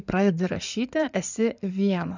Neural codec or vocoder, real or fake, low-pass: codec, 16 kHz in and 24 kHz out, 2.2 kbps, FireRedTTS-2 codec; fake; 7.2 kHz